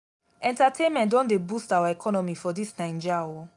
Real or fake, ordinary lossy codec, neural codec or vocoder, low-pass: real; none; none; 10.8 kHz